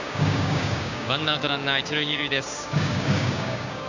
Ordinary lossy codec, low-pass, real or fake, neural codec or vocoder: none; 7.2 kHz; fake; codec, 16 kHz, 6 kbps, DAC